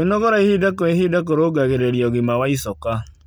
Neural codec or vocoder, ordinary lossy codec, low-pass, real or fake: none; none; none; real